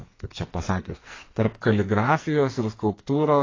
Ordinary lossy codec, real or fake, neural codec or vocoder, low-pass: AAC, 32 kbps; fake; codec, 44.1 kHz, 2.6 kbps, SNAC; 7.2 kHz